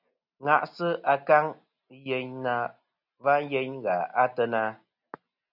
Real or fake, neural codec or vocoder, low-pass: real; none; 5.4 kHz